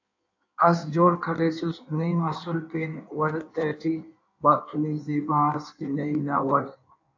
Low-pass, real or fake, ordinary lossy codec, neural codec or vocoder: 7.2 kHz; fake; AAC, 48 kbps; codec, 16 kHz in and 24 kHz out, 1.1 kbps, FireRedTTS-2 codec